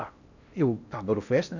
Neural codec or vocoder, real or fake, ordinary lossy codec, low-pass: codec, 16 kHz in and 24 kHz out, 0.8 kbps, FocalCodec, streaming, 65536 codes; fake; none; 7.2 kHz